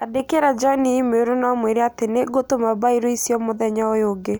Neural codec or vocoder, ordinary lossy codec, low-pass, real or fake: none; none; none; real